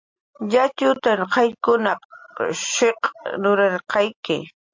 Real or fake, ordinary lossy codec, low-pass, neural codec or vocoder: real; MP3, 48 kbps; 7.2 kHz; none